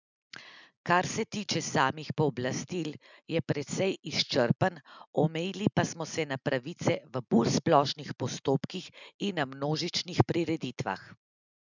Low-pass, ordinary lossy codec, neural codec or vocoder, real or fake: 7.2 kHz; none; none; real